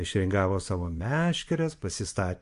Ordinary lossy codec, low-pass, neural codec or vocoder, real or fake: MP3, 64 kbps; 10.8 kHz; vocoder, 24 kHz, 100 mel bands, Vocos; fake